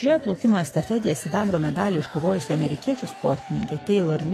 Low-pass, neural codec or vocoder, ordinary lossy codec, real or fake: 14.4 kHz; codec, 44.1 kHz, 2.6 kbps, SNAC; AAC, 48 kbps; fake